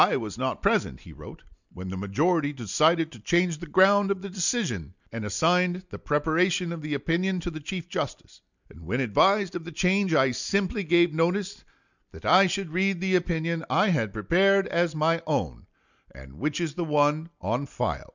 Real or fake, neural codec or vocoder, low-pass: real; none; 7.2 kHz